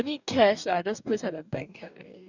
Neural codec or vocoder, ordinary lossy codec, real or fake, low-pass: codec, 44.1 kHz, 2.6 kbps, DAC; none; fake; 7.2 kHz